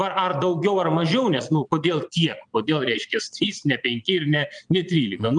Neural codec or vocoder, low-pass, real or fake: vocoder, 22.05 kHz, 80 mel bands, Vocos; 9.9 kHz; fake